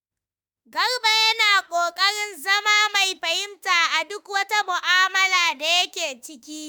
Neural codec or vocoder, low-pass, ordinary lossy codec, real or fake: autoencoder, 48 kHz, 32 numbers a frame, DAC-VAE, trained on Japanese speech; none; none; fake